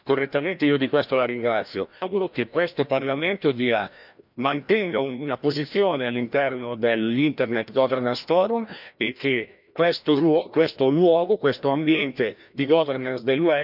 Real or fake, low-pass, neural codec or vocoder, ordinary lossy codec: fake; 5.4 kHz; codec, 16 kHz, 1 kbps, FreqCodec, larger model; none